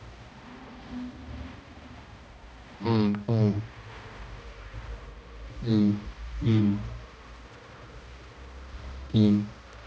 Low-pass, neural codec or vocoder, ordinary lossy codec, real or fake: none; codec, 16 kHz, 1 kbps, X-Codec, HuBERT features, trained on balanced general audio; none; fake